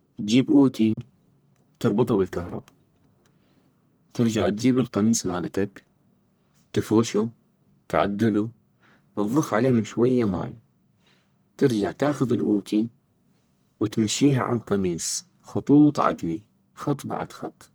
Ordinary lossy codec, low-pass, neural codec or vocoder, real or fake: none; none; codec, 44.1 kHz, 1.7 kbps, Pupu-Codec; fake